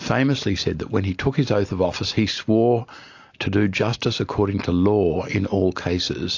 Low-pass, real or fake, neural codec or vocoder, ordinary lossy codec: 7.2 kHz; real; none; AAC, 48 kbps